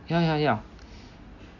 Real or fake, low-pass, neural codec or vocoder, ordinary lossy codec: real; 7.2 kHz; none; none